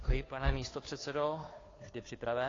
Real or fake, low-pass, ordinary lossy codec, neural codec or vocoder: fake; 7.2 kHz; AAC, 32 kbps; codec, 16 kHz, 2 kbps, FunCodec, trained on Chinese and English, 25 frames a second